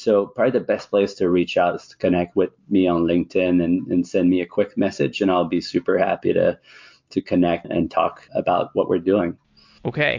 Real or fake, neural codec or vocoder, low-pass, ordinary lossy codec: real; none; 7.2 kHz; MP3, 48 kbps